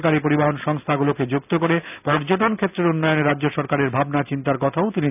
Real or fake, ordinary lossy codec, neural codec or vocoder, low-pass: real; none; none; 3.6 kHz